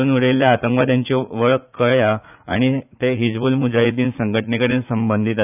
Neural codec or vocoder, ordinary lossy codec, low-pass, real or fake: vocoder, 44.1 kHz, 80 mel bands, Vocos; none; 3.6 kHz; fake